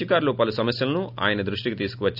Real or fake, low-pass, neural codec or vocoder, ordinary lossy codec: real; 5.4 kHz; none; none